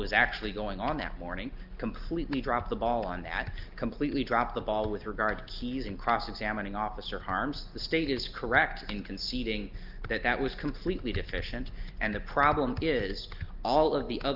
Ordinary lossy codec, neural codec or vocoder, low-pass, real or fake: Opus, 32 kbps; none; 5.4 kHz; real